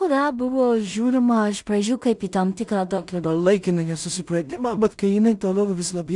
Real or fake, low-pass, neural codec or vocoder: fake; 10.8 kHz; codec, 16 kHz in and 24 kHz out, 0.4 kbps, LongCat-Audio-Codec, two codebook decoder